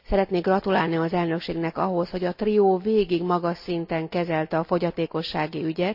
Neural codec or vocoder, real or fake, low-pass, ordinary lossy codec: none; real; 5.4 kHz; AAC, 48 kbps